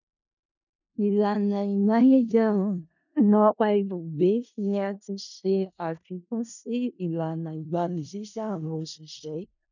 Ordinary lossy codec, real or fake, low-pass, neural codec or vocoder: none; fake; 7.2 kHz; codec, 16 kHz in and 24 kHz out, 0.4 kbps, LongCat-Audio-Codec, four codebook decoder